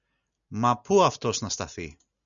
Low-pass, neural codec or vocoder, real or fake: 7.2 kHz; none; real